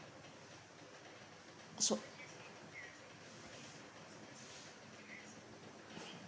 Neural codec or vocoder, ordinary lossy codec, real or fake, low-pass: none; none; real; none